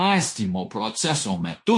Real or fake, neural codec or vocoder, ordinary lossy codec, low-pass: fake; codec, 16 kHz in and 24 kHz out, 0.9 kbps, LongCat-Audio-Codec, fine tuned four codebook decoder; MP3, 48 kbps; 10.8 kHz